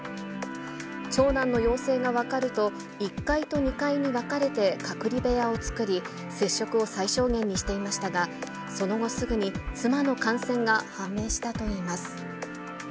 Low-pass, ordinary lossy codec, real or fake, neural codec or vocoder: none; none; real; none